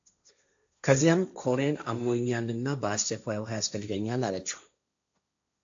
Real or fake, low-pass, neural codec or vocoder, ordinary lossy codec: fake; 7.2 kHz; codec, 16 kHz, 1.1 kbps, Voila-Tokenizer; AAC, 64 kbps